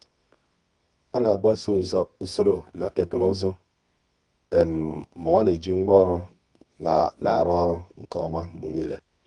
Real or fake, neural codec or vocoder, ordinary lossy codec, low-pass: fake; codec, 24 kHz, 0.9 kbps, WavTokenizer, medium music audio release; Opus, 32 kbps; 10.8 kHz